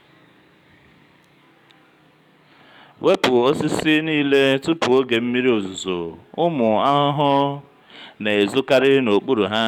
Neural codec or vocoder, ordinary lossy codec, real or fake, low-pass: codec, 44.1 kHz, 7.8 kbps, DAC; none; fake; 19.8 kHz